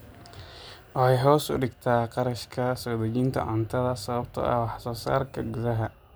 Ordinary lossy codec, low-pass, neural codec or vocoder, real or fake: none; none; none; real